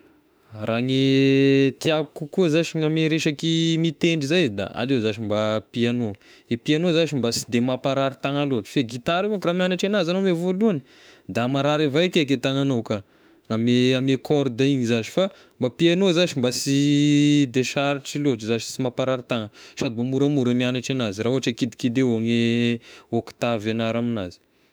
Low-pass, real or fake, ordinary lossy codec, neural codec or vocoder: none; fake; none; autoencoder, 48 kHz, 32 numbers a frame, DAC-VAE, trained on Japanese speech